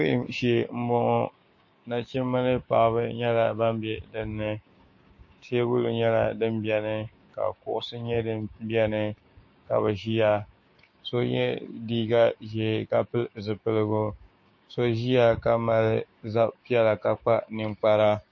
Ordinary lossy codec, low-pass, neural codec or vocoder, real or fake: MP3, 32 kbps; 7.2 kHz; codec, 16 kHz, 6 kbps, DAC; fake